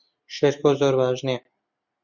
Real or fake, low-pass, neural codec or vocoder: real; 7.2 kHz; none